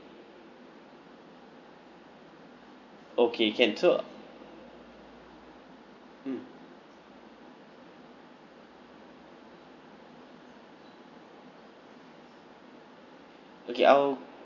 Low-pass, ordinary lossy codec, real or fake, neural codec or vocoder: 7.2 kHz; none; real; none